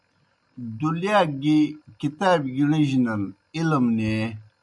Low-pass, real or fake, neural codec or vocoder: 10.8 kHz; real; none